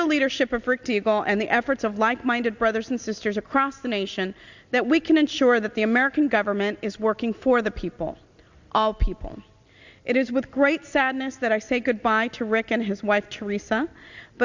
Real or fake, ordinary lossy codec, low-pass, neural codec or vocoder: real; Opus, 64 kbps; 7.2 kHz; none